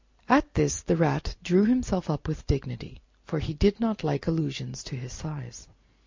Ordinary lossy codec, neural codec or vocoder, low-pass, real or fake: MP3, 64 kbps; none; 7.2 kHz; real